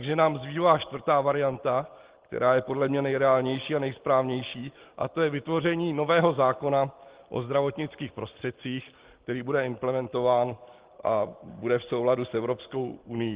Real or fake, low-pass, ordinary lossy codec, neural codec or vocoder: real; 3.6 kHz; Opus, 16 kbps; none